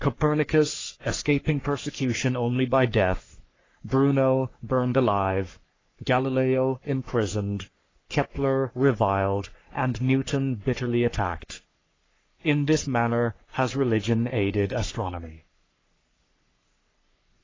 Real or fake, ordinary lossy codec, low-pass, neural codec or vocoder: fake; AAC, 32 kbps; 7.2 kHz; codec, 44.1 kHz, 3.4 kbps, Pupu-Codec